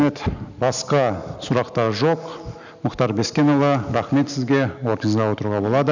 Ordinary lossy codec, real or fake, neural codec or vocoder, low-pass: none; real; none; 7.2 kHz